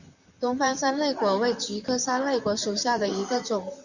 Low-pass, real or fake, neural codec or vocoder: 7.2 kHz; fake; vocoder, 44.1 kHz, 128 mel bands, Pupu-Vocoder